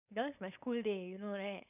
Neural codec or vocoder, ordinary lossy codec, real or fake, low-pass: codec, 16 kHz, 4.8 kbps, FACodec; none; fake; 3.6 kHz